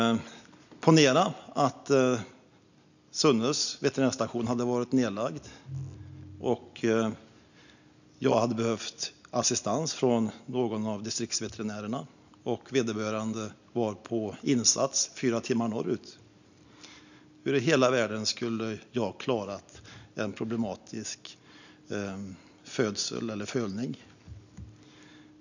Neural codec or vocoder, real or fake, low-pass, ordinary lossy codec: none; real; 7.2 kHz; none